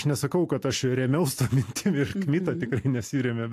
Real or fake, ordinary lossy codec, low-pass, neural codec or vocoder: real; AAC, 64 kbps; 14.4 kHz; none